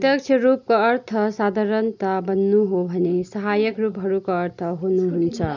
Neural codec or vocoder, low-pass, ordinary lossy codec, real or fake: none; 7.2 kHz; none; real